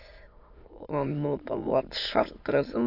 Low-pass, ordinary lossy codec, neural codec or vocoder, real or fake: 5.4 kHz; AAC, 48 kbps; autoencoder, 22.05 kHz, a latent of 192 numbers a frame, VITS, trained on many speakers; fake